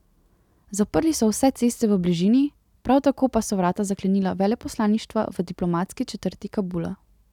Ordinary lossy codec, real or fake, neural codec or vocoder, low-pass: none; real; none; 19.8 kHz